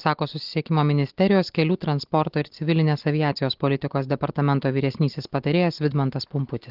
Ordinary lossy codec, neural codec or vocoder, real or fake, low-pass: Opus, 16 kbps; none; real; 5.4 kHz